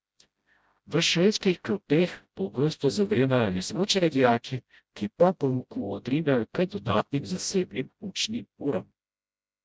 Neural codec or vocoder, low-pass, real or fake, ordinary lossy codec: codec, 16 kHz, 0.5 kbps, FreqCodec, smaller model; none; fake; none